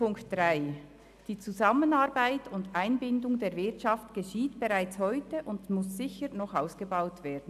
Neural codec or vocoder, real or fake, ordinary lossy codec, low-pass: none; real; AAC, 96 kbps; 14.4 kHz